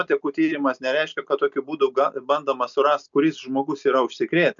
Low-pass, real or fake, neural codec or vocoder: 7.2 kHz; real; none